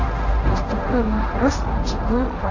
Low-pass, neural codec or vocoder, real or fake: 7.2 kHz; codec, 16 kHz, 1.1 kbps, Voila-Tokenizer; fake